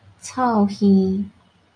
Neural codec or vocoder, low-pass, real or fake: none; 9.9 kHz; real